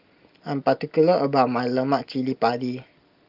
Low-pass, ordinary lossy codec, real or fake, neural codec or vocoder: 5.4 kHz; Opus, 24 kbps; real; none